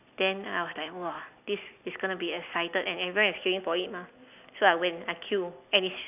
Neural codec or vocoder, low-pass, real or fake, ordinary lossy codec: none; 3.6 kHz; real; none